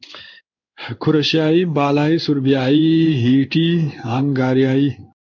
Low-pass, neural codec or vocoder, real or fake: 7.2 kHz; codec, 16 kHz in and 24 kHz out, 1 kbps, XY-Tokenizer; fake